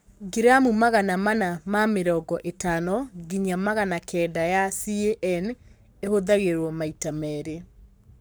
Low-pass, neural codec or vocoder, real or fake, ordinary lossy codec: none; codec, 44.1 kHz, 7.8 kbps, Pupu-Codec; fake; none